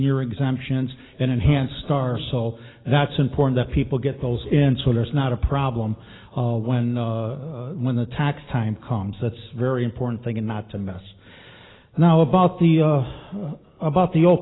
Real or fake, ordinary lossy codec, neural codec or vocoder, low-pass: real; AAC, 16 kbps; none; 7.2 kHz